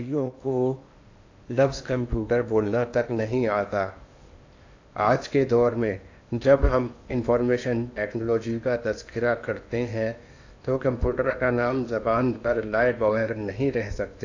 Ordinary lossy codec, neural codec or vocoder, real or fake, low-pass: AAC, 48 kbps; codec, 16 kHz in and 24 kHz out, 0.8 kbps, FocalCodec, streaming, 65536 codes; fake; 7.2 kHz